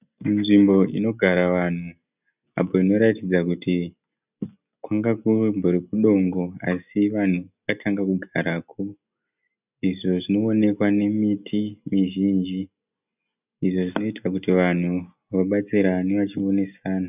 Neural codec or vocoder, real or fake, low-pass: none; real; 3.6 kHz